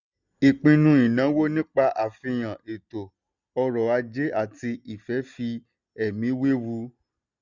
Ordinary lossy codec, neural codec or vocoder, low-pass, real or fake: none; none; 7.2 kHz; real